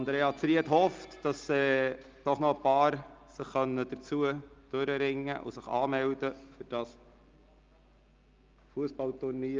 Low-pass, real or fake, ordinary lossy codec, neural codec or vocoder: 7.2 kHz; real; Opus, 32 kbps; none